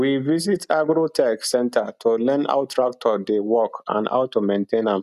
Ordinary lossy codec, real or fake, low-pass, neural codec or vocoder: none; real; 14.4 kHz; none